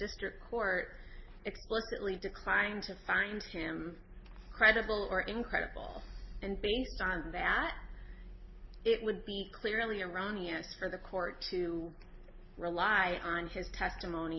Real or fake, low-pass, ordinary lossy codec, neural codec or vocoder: real; 7.2 kHz; MP3, 24 kbps; none